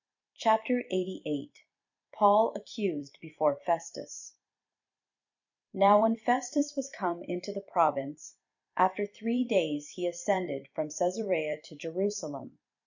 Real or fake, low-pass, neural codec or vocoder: fake; 7.2 kHz; vocoder, 44.1 kHz, 128 mel bands every 256 samples, BigVGAN v2